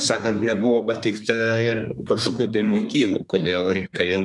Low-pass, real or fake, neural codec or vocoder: 10.8 kHz; fake; codec, 24 kHz, 1 kbps, SNAC